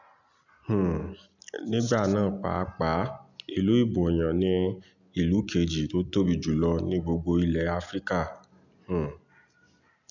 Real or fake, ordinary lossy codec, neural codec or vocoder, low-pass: real; none; none; 7.2 kHz